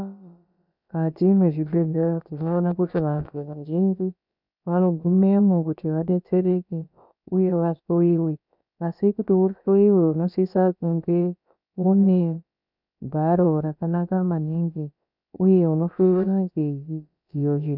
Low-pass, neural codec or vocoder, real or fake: 5.4 kHz; codec, 16 kHz, about 1 kbps, DyCAST, with the encoder's durations; fake